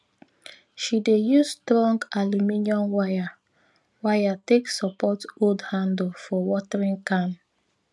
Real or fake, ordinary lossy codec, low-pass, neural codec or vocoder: real; none; none; none